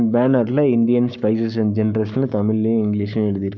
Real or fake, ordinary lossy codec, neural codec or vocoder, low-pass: fake; none; codec, 44.1 kHz, 7.8 kbps, Pupu-Codec; 7.2 kHz